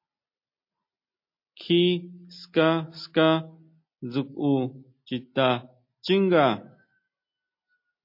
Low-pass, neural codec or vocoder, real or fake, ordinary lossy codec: 5.4 kHz; none; real; MP3, 32 kbps